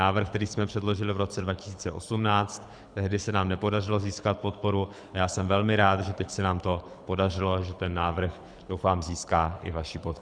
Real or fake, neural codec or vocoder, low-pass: fake; codec, 24 kHz, 6 kbps, HILCodec; 9.9 kHz